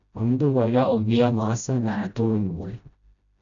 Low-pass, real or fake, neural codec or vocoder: 7.2 kHz; fake; codec, 16 kHz, 0.5 kbps, FreqCodec, smaller model